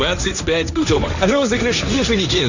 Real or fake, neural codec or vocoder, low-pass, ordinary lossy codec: fake; codec, 16 kHz, 1.1 kbps, Voila-Tokenizer; 7.2 kHz; none